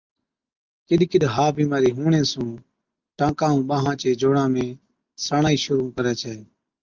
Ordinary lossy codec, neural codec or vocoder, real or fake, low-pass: Opus, 24 kbps; none; real; 7.2 kHz